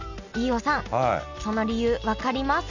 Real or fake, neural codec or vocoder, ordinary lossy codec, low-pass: real; none; none; 7.2 kHz